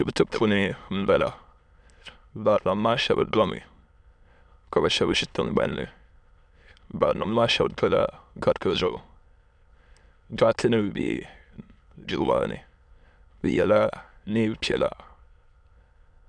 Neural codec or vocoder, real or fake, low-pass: autoencoder, 22.05 kHz, a latent of 192 numbers a frame, VITS, trained on many speakers; fake; 9.9 kHz